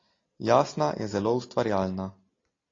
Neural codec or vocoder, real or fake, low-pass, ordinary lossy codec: none; real; 7.2 kHz; AAC, 32 kbps